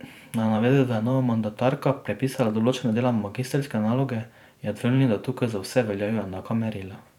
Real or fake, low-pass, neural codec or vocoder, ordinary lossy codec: fake; 19.8 kHz; vocoder, 44.1 kHz, 128 mel bands every 512 samples, BigVGAN v2; none